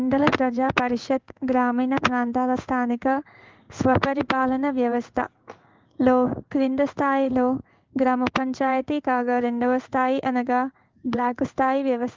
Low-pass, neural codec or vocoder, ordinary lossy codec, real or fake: 7.2 kHz; codec, 16 kHz in and 24 kHz out, 1 kbps, XY-Tokenizer; Opus, 32 kbps; fake